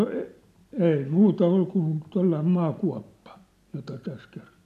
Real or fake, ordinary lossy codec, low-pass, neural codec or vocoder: real; none; 14.4 kHz; none